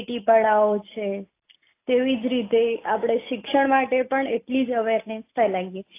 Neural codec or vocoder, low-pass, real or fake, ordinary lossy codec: none; 3.6 kHz; real; AAC, 24 kbps